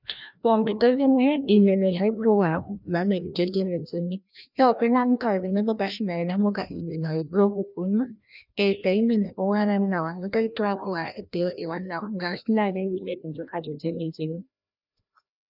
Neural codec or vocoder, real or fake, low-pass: codec, 16 kHz, 1 kbps, FreqCodec, larger model; fake; 5.4 kHz